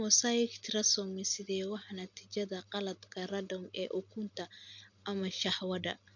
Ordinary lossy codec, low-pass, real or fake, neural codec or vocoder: none; 7.2 kHz; real; none